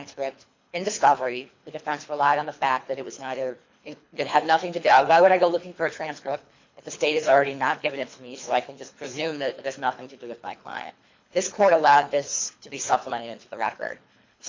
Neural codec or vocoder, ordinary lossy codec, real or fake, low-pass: codec, 24 kHz, 3 kbps, HILCodec; MP3, 64 kbps; fake; 7.2 kHz